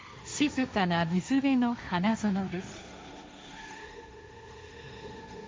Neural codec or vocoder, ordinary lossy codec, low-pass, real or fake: codec, 16 kHz, 1.1 kbps, Voila-Tokenizer; none; none; fake